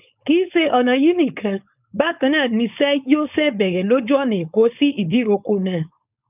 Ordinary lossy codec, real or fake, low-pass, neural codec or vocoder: none; fake; 3.6 kHz; codec, 16 kHz, 4.8 kbps, FACodec